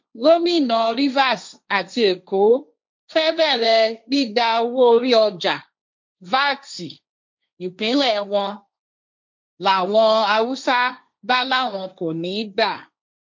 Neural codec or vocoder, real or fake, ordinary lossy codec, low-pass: codec, 16 kHz, 1.1 kbps, Voila-Tokenizer; fake; MP3, 48 kbps; 7.2 kHz